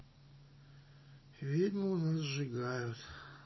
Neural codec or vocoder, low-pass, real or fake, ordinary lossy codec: none; 7.2 kHz; real; MP3, 24 kbps